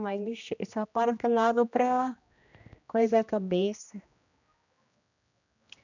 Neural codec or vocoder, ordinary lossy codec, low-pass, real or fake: codec, 16 kHz, 1 kbps, X-Codec, HuBERT features, trained on general audio; none; 7.2 kHz; fake